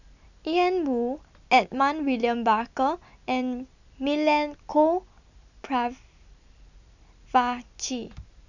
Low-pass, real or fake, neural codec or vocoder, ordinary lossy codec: 7.2 kHz; real; none; none